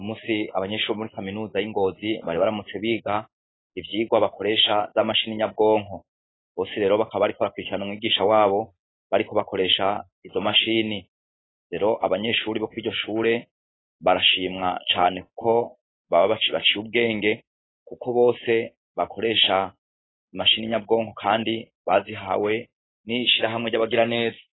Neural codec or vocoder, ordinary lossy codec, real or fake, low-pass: none; AAC, 16 kbps; real; 7.2 kHz